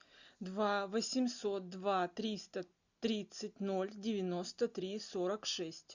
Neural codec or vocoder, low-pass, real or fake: none; 7.2 kHz; real